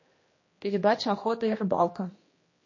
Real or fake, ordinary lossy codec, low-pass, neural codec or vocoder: fake; MP3, 32 kbps; 7.2 kHz; codec, 16 kHz, 1 kbps, X-Codec, HuBERT features, trained on general audio